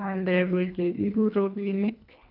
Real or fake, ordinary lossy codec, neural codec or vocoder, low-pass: fake; none; codec, 24 kHz, 1.5 kbps, HILCodec; 5.4 kHz